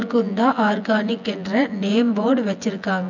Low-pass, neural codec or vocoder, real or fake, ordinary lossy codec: 7.2 kHz; vocoder, 24 kHz, 100 mel bands, Vocos; fake; none